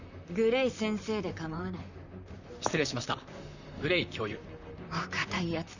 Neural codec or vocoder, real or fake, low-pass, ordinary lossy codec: vocoder, 44.1 kHz, 128 mel bands, Pupu-Vocoder; fake; 7.2 kHz; none